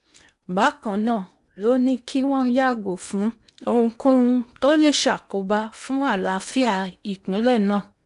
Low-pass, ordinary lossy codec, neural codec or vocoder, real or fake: 10.8 kHz; AAC, 96 kbps; codec, 16 kHz in and 24 kHz out, 0.8 kbps, FocalCodec, streaming, 65536 codes; fake